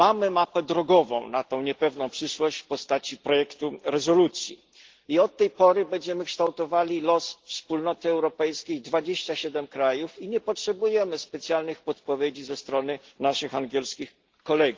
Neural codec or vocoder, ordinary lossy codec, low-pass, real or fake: none; Opus, 16 kbps; 7.2 kHz; real